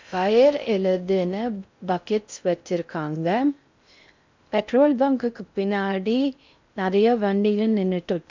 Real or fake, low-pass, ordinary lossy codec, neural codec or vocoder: fake; 7.2 kHz; MP3, 64 kbps; codec, 16 kHz in and 24 kHz out, 0.6 kbps, FocalCodec, streaming, 4096 codes